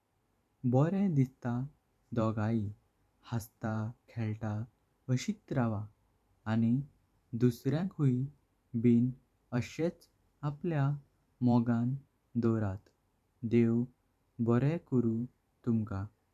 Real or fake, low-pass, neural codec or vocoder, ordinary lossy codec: fake; 14.4 kHz; vocoder, 44.1 kHz, 128 mel bands every 512 samples, BigVGAN v2; none